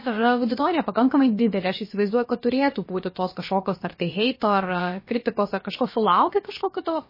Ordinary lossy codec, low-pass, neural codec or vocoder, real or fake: MP3, 24 kbps; 5.4 kHz; codec, 16 kHz, about 1 kbps, DyCAST, with the encoder's durations; fake